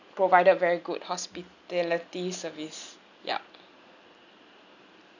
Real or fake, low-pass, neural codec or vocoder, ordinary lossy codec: real; 7.2 kHz; none; none